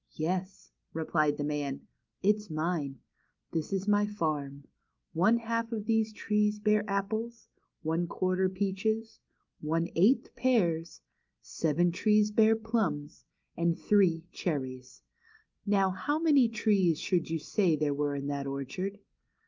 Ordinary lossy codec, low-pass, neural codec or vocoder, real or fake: Opus, 24 kbps; 7.2 kHz; none; real